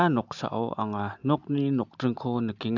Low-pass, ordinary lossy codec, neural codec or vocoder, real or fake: 7.2 kHz; MP3, 64 kbps; none; real